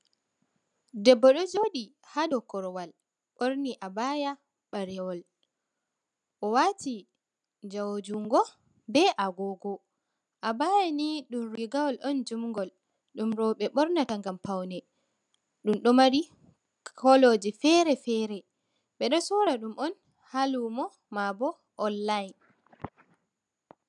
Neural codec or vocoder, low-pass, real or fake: none; 10.8 kHz; real